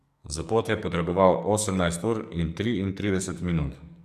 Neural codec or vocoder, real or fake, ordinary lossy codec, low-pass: codec, 44.1 kHz, 2.6 kbps, SNAC; fake; none; 14.4 kHz